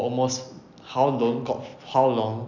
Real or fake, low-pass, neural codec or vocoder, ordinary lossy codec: real; 7.2 kHz; none; none